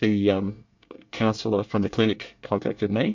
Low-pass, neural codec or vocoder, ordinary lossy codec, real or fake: 7.2 kHz; codec, 24 kHz, 1 kbps, SNAC; MP3, 64 kbps; fake